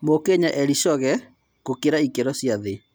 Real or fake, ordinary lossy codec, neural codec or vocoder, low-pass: real; none; none; none